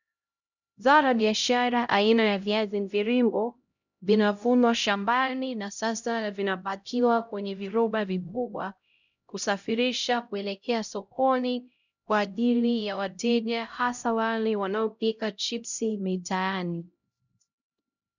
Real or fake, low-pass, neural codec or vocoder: fake; 7.2 kHz; codec, 16 kHz, 0.5 kbps, X-Codec, HuBERT features, trained on LibriSpeech